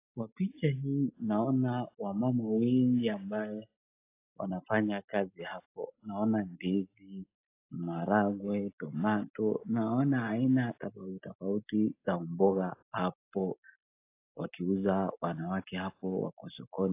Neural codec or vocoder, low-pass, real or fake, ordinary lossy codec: none; 3.6 kHz; real; AAC, 24 kbps